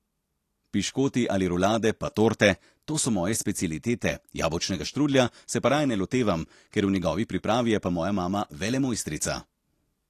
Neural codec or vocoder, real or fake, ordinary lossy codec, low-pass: none; real; AAC, 48 kbps; 14.4 kHz